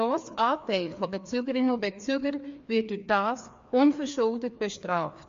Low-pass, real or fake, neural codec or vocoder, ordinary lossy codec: 7.2 kHz; fake; codec, 16 kHz, 2 kbps, FreqCodec, larger model; MP3, 48 kbps